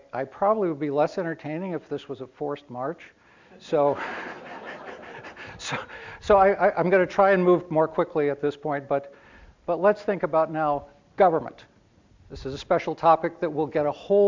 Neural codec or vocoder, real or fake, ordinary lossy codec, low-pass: none; real; MP3, 64 kbps; 7.2 kHz